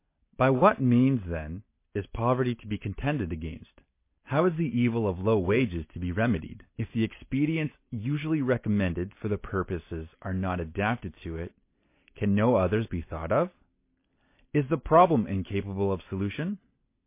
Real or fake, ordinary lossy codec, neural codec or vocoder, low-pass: real; MP3, 24 kbps; none; 3.6 kHz